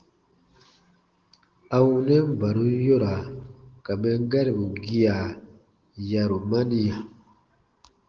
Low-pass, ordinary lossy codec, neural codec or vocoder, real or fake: 7.2 kHz; Opus, 16 kbps; none; real